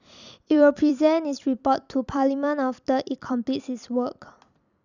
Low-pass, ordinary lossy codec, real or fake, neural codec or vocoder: 7.2 kHz; none; fake; vocoder, 44.1 kHz, 128 mel bands every 256 samples, BigVGAN v2